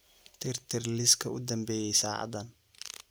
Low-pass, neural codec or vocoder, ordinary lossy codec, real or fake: none; none; none; real